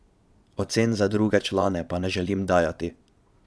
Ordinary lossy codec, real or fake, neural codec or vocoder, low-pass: none; fake; vocoder, 22.05 kHz, 80 mel bands, Vocos; none